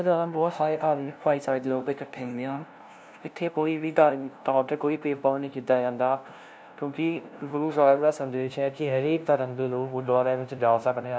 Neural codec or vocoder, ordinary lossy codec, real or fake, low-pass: codec, 16 kHz, 0.5 kbps, FunCodec, trained on LibriTTS, 25 frames a second; none; fake; none